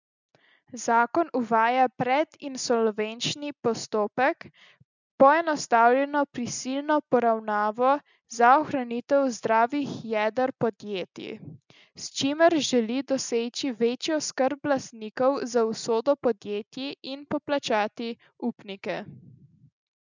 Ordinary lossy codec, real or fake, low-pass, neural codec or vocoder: none; real; 7.2 kHz; none